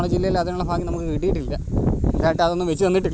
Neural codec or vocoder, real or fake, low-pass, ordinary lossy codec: none; real; none; none